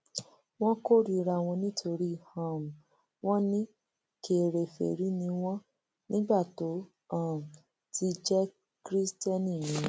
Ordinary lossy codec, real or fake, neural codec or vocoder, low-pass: none; real; none; none